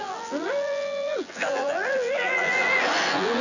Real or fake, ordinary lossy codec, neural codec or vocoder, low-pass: fake; none; vocoder, 24 kHz, 100 mel bands, Vocos; 7.2 kHz